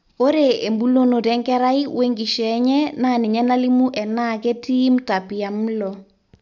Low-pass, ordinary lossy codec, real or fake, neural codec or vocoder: 7.2 kHz; none; real; none